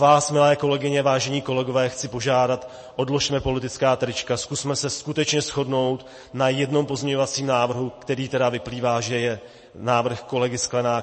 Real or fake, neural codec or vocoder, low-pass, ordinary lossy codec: real; none; 10.8 kHz; MP3, 32 kbps